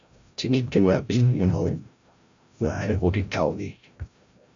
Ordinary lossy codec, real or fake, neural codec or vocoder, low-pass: MP3, 64 kbps; fake; codec, 16 kHz, 0.5 kbps, FreqCodec, larger model; 7.2 kHz